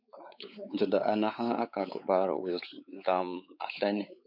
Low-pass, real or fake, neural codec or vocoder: 5.4 kHz; fake; codec, 16 kHz, 4 kbps, X-Codec, WavLM features, trained on Multilingual LibriSpeech